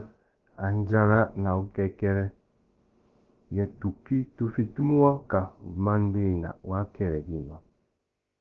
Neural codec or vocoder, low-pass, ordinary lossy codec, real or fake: codec, 16 kHz, about 1 kbps, DyCAST, with the encoder's durations; 7.2 kHz; Opus, 16 kbps; fake